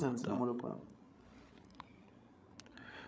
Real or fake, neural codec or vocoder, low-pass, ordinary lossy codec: fake; codec, 16 kHz, 16 kbps, FreqCodec, larger model; none; none